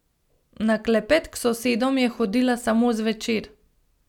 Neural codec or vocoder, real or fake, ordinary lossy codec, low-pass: none; real; none; 19.8 kHz